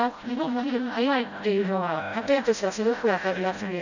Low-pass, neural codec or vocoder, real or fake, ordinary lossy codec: 7.2 kHz; codec, 16 kHz, 0.5 kbps, FreqCodec, smaller model; fake; none